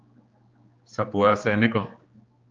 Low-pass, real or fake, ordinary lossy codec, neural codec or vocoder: 7.2 kHz; fake; Opus, 24 kbps; codec, 16 kHz, 2 kbps, X-Codec, HuBERT features, trained on general audio